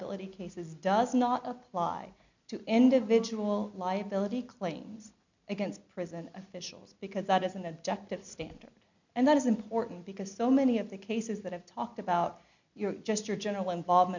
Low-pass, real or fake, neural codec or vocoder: 7.2 kHz; real; none